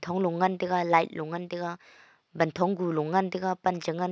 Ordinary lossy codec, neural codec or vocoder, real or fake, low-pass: none; none; real; none